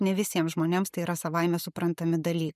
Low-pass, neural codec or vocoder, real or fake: 14.4 kHz; none; real